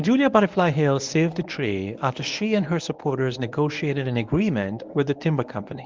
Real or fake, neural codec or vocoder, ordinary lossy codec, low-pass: fake; codec, 24 kHz, 0.9 kbps, WavTokenizer, medium speech release version 1; Opus, 24 kbps; 7.2 kHz